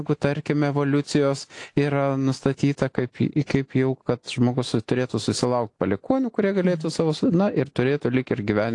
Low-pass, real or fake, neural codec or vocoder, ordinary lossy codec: 10.8 kHz; real; none; AAC, 48 kbps